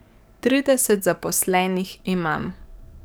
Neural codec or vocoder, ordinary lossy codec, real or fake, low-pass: codec, 44.1 kHz, 7.8 kbps, DAC; none; fake; none